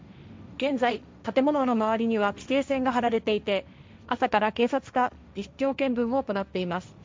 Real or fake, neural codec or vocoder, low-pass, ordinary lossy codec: fake; codec, 16 kHz, 1.1 kbps, Voila-Tokenizer; none; none